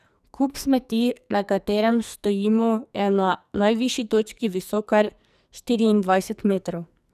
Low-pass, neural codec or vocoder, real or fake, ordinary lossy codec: 14.4 kHz; codec, 44.1 kHz, 2.6 kbps, SNAC; fake; none